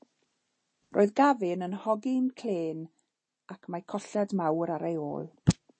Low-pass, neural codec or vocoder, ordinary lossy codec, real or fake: 9.9 kHz; none; MP3, 32 kbps; real